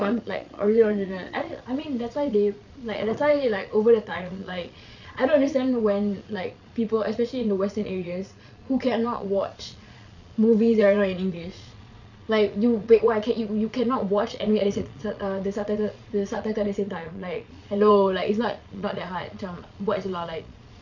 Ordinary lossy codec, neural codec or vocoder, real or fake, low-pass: none; vocoder, 22.05 kHz, 80 mel bands, Vocos; fake; 7.2 kHz